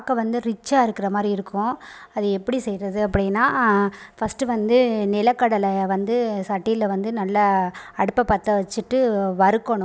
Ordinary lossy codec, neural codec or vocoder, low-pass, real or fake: none; none; none; real